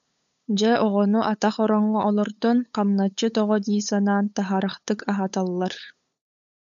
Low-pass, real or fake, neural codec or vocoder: 7.2 kHz; fake; codec, 16 kHz, 8 kbps, FunCodec, trained on LibriTTS, 25 frames a second